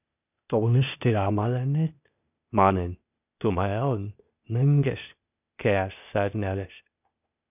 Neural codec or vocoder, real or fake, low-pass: codec, 16 kHz, 0.8 kbps, ZipCodec; fake; 3.6 kHz